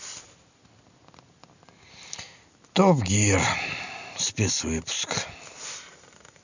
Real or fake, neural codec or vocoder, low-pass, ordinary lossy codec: real; none; 7.2 kHz; none